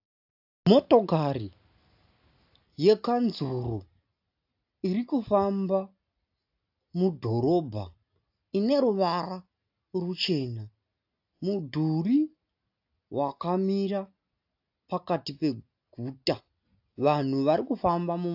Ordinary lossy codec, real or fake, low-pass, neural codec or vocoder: AAC, 48 kbps; real; 5.4 kHz; none